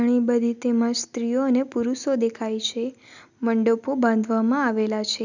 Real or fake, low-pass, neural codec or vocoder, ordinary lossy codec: real; 7.2 kHz; none; none